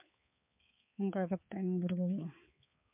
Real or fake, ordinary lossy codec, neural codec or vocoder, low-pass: fake; MP3, 32 kbps; codec, 16 kHz, 4 kbps, X-Codec, HuBERT features, trained on balanced general audio; 3.6 kHz